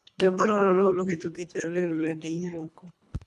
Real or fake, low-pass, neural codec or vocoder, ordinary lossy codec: fake; none; codec, 24 kHz, 1.5 kbps, HILCodec; none